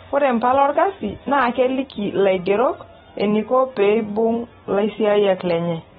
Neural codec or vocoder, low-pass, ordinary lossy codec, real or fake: none; 10.8 kHz; AAC, 16 kbps; real